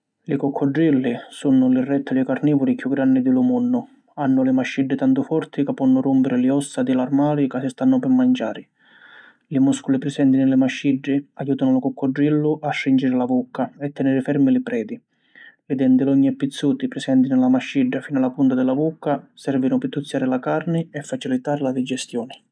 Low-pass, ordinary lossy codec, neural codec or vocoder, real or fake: 9.9 kHz; none; none; real